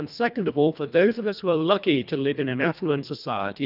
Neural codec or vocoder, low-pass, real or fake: codec, 24 kHz, 1.5 kbps, HILCodec; 5.4 kHz; fake